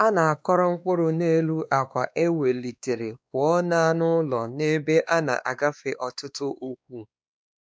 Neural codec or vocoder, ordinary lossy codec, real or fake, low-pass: codec, 16 kHz, 2 kbps, X-Codec, WavLM features, trained on Multilingual LibriSpeech; none; fake; none